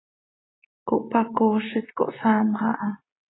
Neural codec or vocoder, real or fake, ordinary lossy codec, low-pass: none; real; AAC, 16 kbps; 7.2 kHz